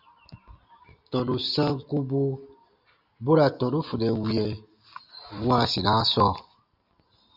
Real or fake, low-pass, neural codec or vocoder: real; 5.4 kHz; none